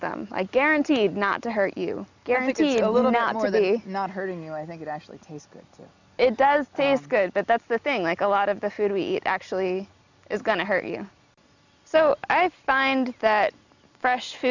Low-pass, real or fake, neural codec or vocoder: 7.2 kHz; real; none